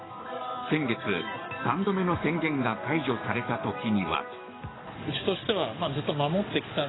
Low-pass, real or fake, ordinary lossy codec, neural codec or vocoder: 7.2 kHz; fake; AAC, 16 kbps; codec, 44.1 kHz, 7.8 kbps, DAC